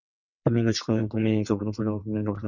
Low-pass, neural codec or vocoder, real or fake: 7.2 kHz; vocoder, 22.05 kHz, 80 mel bands, WaveNeXt; fake